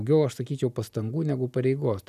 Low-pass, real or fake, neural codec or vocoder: 14.4 kHz; real; none